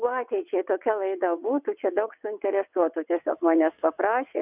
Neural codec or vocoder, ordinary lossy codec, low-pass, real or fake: vocoder, 22.05 kHz, 80 mel bands, WaveNeXt; Opus, 64 kbps; 3.6 kHz; fake